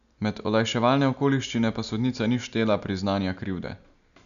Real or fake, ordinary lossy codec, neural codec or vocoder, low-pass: real; none; none; 7.2 kHz